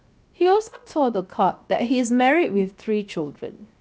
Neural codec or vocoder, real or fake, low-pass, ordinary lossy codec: codec, 16 kHz, 0.7 kbps, FocalCodec; fake; none; none